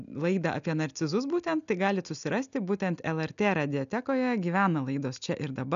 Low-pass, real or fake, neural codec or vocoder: 7.2 kHz; real; none